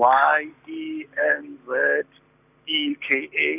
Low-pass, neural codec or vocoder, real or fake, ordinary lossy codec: 3.6 kHz; none; real; none